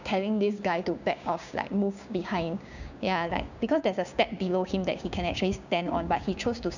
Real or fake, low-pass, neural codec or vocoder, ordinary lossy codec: fake; 7.2 kHz; codec, 16 kHz, 6 kbps, DAC; none